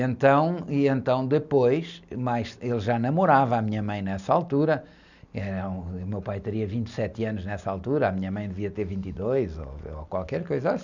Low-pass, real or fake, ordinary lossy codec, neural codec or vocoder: 7.2 kHz; real; none; none